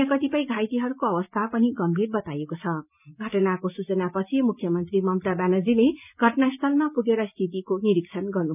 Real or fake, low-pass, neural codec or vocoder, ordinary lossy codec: real; 3.6 kHz; none; none